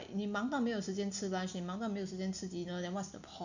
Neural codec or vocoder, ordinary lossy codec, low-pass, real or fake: none; none; 7.2 kHz; real